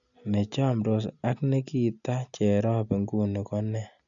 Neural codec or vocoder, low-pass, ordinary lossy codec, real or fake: none; 7.2 kHz; none; real